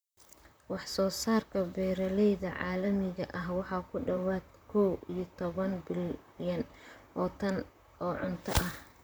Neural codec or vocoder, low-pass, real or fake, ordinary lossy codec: vocoder, 44.1 kHz, 128 mel bands, Pupu-Vocoder; none; fake; none